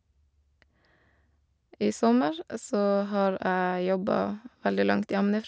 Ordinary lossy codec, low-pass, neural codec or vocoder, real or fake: none; none; none; real